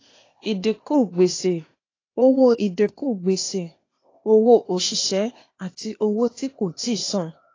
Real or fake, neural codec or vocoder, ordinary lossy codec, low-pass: fake; codec, 16 kHz, 0.8 kbps, ZipCodec; AAC, 32 kbps; 7.2 kHz